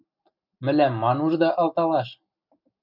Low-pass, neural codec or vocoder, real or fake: 5.4 kHz; none; real